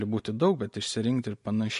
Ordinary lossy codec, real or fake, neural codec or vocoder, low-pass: MP3, 48 kbps; real; none; 14.4 kHz